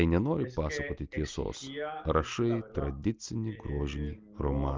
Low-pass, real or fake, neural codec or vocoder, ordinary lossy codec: 7.2 kHz; real; none; Opus, 24 kbps